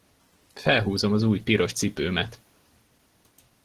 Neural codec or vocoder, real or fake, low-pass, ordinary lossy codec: none; real; 14.4 kHz; Opus, 16 kbps